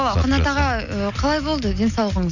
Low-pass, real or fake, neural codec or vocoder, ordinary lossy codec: 7.2 kHz; real; none; none